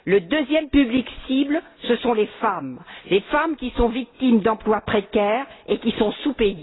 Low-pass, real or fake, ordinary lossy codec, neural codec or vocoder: 7.2 kHz; real; AAC, 16 kbps; none